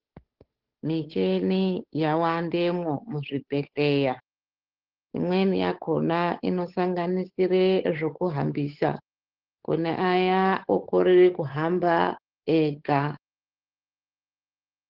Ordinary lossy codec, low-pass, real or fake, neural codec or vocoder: Opus, 16 kbps; 5.4 kHz; fake; codec, 16 kHz, 8 kbps, FunCodec, trained on Chinese and English, 25 frames a second